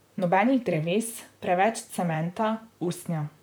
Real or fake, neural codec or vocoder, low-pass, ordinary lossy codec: fake; vocoder, 44.1 kHz, 128 mel bands, Pupu-Vocoder; none; none